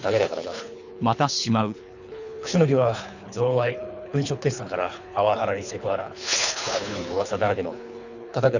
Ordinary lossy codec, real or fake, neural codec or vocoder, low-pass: none; fake; codec, 24 kHz, 3 kbps, HILCodec; 7.2 kHz